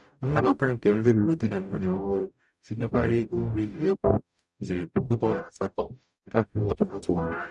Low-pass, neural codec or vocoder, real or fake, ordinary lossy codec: 10.8 kHz; codec, 44.1 kHz, 0.9 kbps, DAC; fake; none